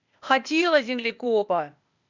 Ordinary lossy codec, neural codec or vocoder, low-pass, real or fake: none; codec, 16 kHz, 0.8 kbps, ZipCodec; 7.2 kHz; fake